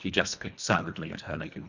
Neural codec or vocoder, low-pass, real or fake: codec, 24 kHz, 1.5 kbps, HILCodec; 7.2 kHz; fake